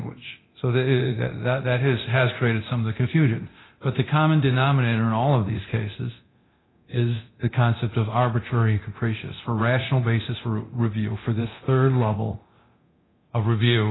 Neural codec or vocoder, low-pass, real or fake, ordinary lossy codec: codec, 24 kHz, 0.9 kbps, DualCodec; 7.2 kHz; fake; AAC, 16 kbps